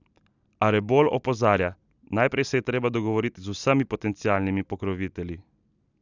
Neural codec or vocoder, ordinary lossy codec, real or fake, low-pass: none; none; real; 7.2 kHz